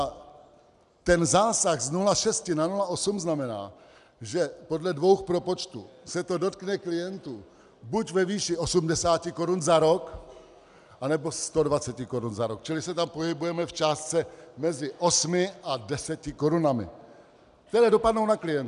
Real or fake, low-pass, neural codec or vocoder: real; 10.8 kHz; none